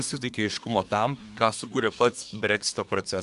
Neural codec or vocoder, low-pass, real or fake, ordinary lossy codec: codec, 24 kHz, 1 kbps, SNAC; 10.8 kHz; fake; AAC, 96 kbps